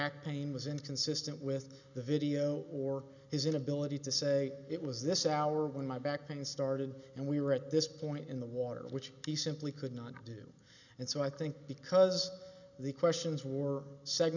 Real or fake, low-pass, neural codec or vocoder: real; 7.2 kHz; none